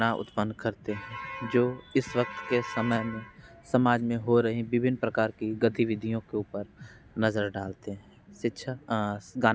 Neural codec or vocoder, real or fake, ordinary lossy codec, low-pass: none; real; none; none